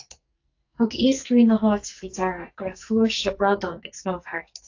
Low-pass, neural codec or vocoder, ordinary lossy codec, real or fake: 7.2 kHz; codec, 44.1 kHz, 2.6 kbps, SNAC; AAC, 48 kbps; fake